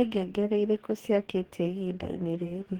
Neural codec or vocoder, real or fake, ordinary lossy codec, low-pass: codec, 44.1 kHz, 2.6 kbps, DAC; fake; Opus, 16 kbps; 19.8 kHz